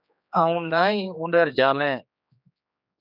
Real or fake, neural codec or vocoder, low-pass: fake; codec, 16 kHz, 2 kbps, X-Codec, HuBERT features, trained on general audio; 5.4 kHz